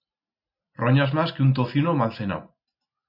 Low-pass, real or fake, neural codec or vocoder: 5.4 kHz; real; none